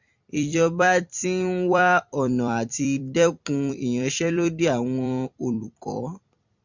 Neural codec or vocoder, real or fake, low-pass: vocoder, 44.1 kHz, 128 mel bands every 256 samples, BigVGAN v2; fake; 7.2 kHz